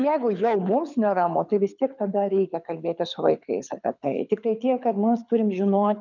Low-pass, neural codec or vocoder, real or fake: 7.2 kHz; codec, 24 kHz, 6 kbps, HILCodec; fake